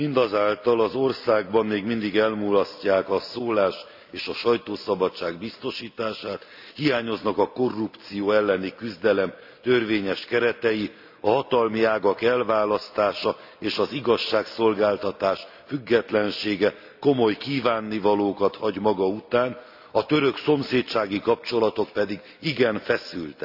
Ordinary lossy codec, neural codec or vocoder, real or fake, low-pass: AAC, 48 kbps; none; real; 5.4 kHz